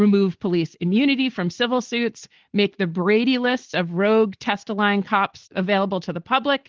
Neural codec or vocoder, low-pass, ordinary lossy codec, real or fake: none; 7.2 kHz; Opus, 16 kbps; real